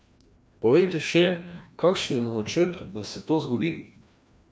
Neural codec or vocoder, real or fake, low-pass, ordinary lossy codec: codec, 16 kHz, 1 kbps, FreqCodec, larger model; fake; none; none